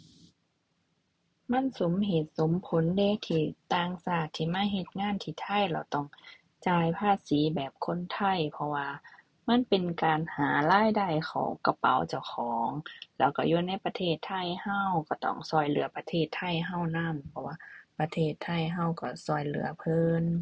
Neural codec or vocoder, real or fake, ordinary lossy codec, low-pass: none; real; none; none